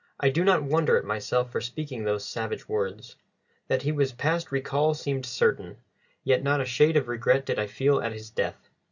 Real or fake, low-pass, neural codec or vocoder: real; 7.2 kHz; none